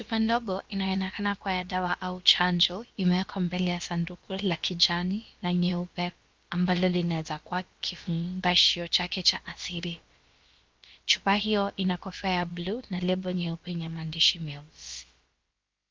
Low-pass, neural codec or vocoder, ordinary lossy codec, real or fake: 7.2 kHz; codec, 16 kHz, about 1 kbps, DyCAST, with the encoder's durations; Opus, 32 kbps; fake